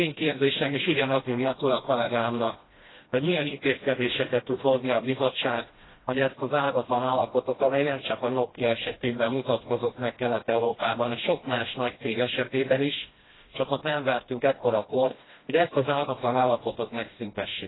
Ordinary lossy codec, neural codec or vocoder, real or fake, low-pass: AAC, 16 kbps; codec, 16 kHz, 1 kbps, FreqCodec, smaller model; fake; 7.2 kHz